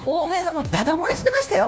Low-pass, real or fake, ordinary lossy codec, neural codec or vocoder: none; fake; none; codec, 16 kHz, 1 kbps, FunCodec, trained on LibriTTS, 50 frames a second